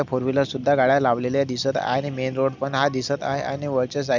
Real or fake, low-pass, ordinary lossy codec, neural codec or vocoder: real; 7.2 kHz; none; none